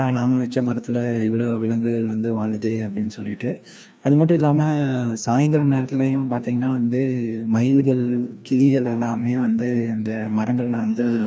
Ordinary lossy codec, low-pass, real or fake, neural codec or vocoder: none; none; fake; codec, 16 kHz, 1 kbps, FreqCodec, larger model